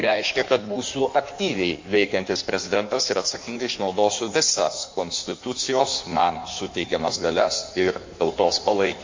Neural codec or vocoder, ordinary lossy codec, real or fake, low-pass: codec, 16 kHz in and 24 kHz out, 1.1 kbps, FireRedTTS-2 codec; MP3, 48 kbps; fake; 7.2 kHz